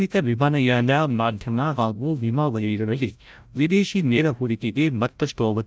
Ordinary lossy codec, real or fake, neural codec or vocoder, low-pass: none; fake; codec, 16 kHz, 0.5 kbps, FreqCodec, larger model; none